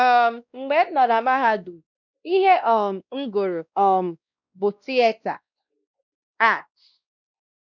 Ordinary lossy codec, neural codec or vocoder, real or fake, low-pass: none; codec, 16 kHz, 1 kbps, X-Codec, WavLM features, trained on Multilingual LibriSpeech; fake; 7.2 kHz